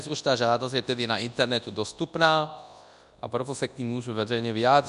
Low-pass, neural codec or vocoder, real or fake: 10.8 kHz; codec, 24 kHz, 0.9 kbps, WavTokenizer, large speech release; fake